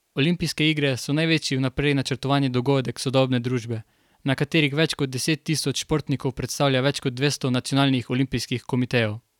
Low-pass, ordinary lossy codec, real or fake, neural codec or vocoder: 19.8 kHz; none; real; none